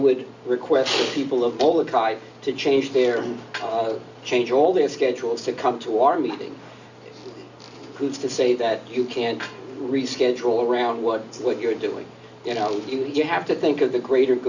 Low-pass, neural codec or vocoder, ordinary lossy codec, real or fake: 7.2 kHz; none; Opus, 64 kbps; real